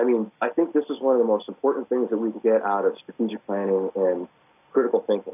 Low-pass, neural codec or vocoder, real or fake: 3.6 kHz; none; real